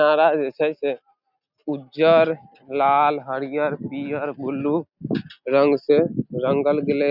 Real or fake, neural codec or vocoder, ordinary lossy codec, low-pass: real; none; none; 5.4 kHz